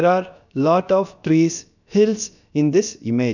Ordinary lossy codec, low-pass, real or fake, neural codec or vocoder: none; 7.2 kHz; fake; codec, 16 kHz, about 1 kbps, DyCAST, with the encoder's durations